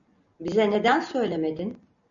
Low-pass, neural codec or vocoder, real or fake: 7.2 kHz; none; real